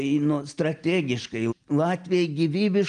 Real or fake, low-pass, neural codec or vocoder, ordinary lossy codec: real; 9.9 kHz; none; Opus, 32 kbps